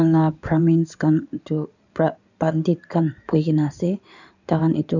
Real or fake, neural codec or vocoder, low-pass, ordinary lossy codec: fake; codec, 16 kHz in and 24 kHz out, 2.2 kbps, FireRedTTS-2 codec; 7.2 kHz; none